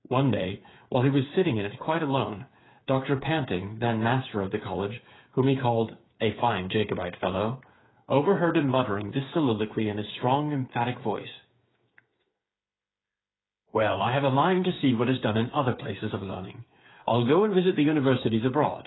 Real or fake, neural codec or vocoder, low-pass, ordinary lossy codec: fake; codec, 16 kHz, 8 kbps, FreqCodec, smaller model; 7.2 kHz; AAC, 16 kbps